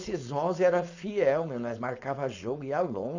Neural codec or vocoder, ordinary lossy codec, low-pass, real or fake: codec, 16 kHz, 4.8 kbps, FACodec; AAC, 48 kbps; 7.2 kHz; fake